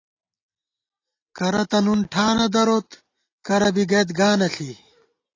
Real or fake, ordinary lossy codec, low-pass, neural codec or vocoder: real; AAC, 32 kbps; 7.2 kHz; none